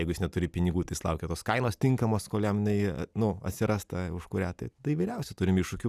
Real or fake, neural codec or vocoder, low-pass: real; none; 14.4 kHz